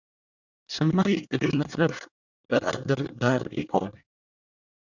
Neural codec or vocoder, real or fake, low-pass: codec, 24 kHz, 1 kbps, SNAC; fake; 7.2 kHz